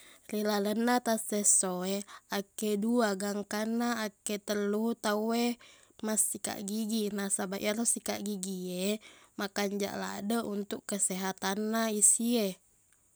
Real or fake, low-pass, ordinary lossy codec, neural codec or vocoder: real; none; none; none